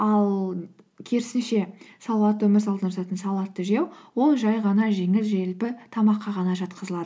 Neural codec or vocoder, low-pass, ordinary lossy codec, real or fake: none; none; none; real